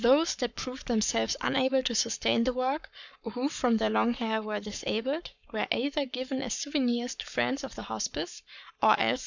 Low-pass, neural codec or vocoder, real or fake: 7.2 kHz; codec, 44.1 kHz, 7.8 kbps, Pupu-Codec; fake